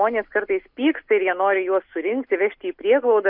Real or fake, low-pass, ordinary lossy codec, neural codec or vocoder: real; 5.4 kHz; MP3, 48 kbps; none